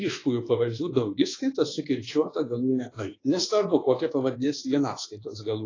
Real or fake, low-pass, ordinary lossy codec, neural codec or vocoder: fake; 7.2 kHz; AAC, 32 kbps; codec, 24 kHz, 1.2 kbps, DualCodec